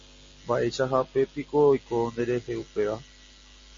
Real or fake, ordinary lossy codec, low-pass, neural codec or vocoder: real; MP3, 32 kbps; 7.2 kHz; none